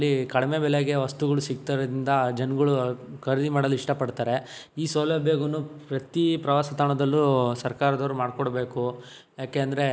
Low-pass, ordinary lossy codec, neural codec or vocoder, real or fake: none; none; none; real